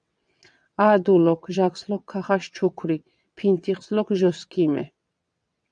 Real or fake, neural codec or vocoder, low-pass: fake; vocoder, 22.05 kHz, 80 mel bands, WaveNeXt; 9.9 kHz